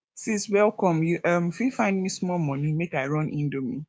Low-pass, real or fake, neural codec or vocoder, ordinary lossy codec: none; fake; codec, 16 kHz, 6 kbps, DAC; none